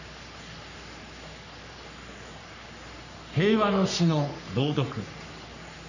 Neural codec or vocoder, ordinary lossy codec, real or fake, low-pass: codec, 44.1 kHz, 3.4 kbps, Pupu-Codec; none; fake; 7.2 kHz